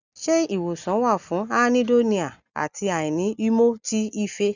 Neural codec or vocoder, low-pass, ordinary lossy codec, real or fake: none; 7.2 kHz; none; real